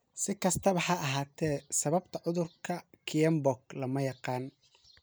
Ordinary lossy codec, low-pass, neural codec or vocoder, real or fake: none; none; none; real